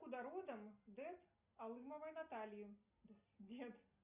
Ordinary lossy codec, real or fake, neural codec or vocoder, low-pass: Opus, 64 kbps; real; none; 3.6 kHz